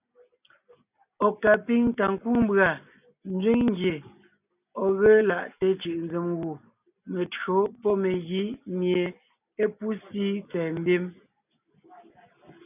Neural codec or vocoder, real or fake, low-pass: none; real; 3.6 kHz